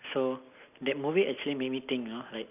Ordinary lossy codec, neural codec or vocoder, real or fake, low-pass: none; none; real; 3.6 kHz